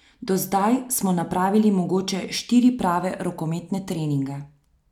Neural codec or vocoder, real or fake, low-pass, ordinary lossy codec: none; real; 19.8 kHz; none